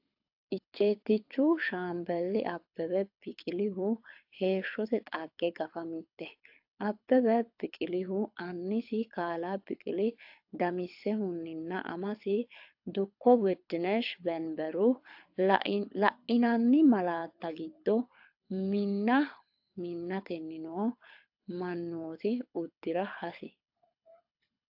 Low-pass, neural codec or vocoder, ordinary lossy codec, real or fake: 5.4 kHz; codec, 24 kHz, 6 kbps, HILCodec; AAC, 48 kbps; fake